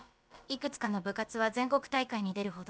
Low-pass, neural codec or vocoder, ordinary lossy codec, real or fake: none; codec, 16 kHz, about 1 kbps, DyCAST, with the encoder's durations; none; fake